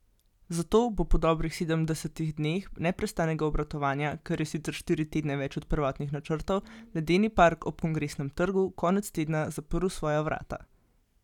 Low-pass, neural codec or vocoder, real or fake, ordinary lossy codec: 19.8 kHz; none; real; none